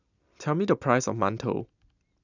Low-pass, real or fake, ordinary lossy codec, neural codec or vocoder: 7.2 kHz; real; none; none